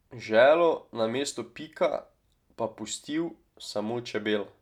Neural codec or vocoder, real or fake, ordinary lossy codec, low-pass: none; real; none; 19.8 kHz